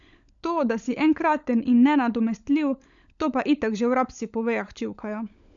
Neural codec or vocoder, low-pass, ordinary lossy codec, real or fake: codec, 16 kHz, 16 kbps, FunCodec, trained on LibriTTS, 50 frames a second; 7.2 kHz; none; fake